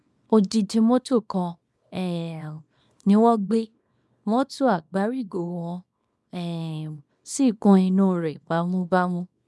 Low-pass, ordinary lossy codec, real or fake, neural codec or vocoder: none; none; fake; codec, 24 kHz, 0.9 kbps, WavTokenizer, small release